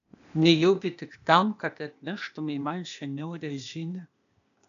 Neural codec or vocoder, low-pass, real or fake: codec, 16 kHz, 0.8 kbps, ZipCodec; 7.2 kHz; fake